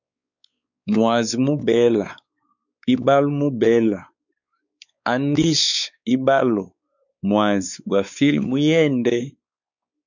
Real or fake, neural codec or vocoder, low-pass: fake; codec, 16 kHz, 4 kbps, X-Codec, WavLM features, trained on Multilingual LibriSpeech; 7.2 kHz